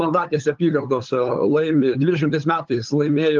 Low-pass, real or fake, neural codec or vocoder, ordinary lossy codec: 7.2 kHz; fake; codec, 16 kHz, 8 kbps, FunCodec, trained on LibriTTS, 25 frames a second; Opus, 32 kbps